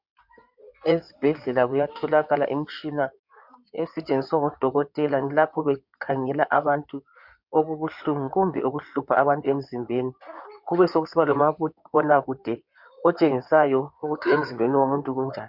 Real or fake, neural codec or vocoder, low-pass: fake; codec, 16 kHz in and 24 kHz out, 2.2 kbps, FireRedTTS-2 codec; 5.4 kHz